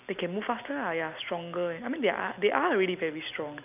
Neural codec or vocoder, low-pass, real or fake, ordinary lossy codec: none; 3.6 kHz; real; none